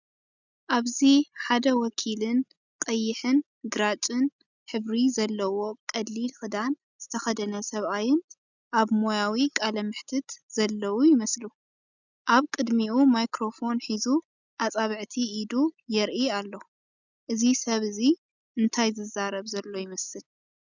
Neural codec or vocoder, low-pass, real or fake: none; 7.2 kHz; real